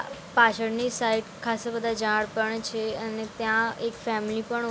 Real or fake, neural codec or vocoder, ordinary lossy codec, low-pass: real; none; none; none